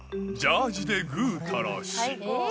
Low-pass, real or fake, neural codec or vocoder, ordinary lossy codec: none; real; none; none